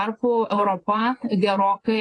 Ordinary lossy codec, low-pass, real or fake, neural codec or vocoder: AAC, 32 kbps; 10.8 kHz; fake; vocoder, 24 kHz, 100 mel bands, Vocos